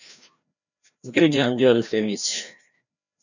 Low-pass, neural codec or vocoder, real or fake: 7.2 kHz; codec, 16 kHz, 1 kbps, FreqCodec, larger model; fake